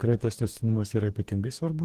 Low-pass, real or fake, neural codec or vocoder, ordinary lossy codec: 14.4 kHz; fake; codec, 44.1 kHz, 2.6 kbps, DAC; Opus, 16 kbps